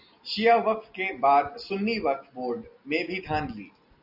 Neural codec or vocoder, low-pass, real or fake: none; 5.4 kHz; real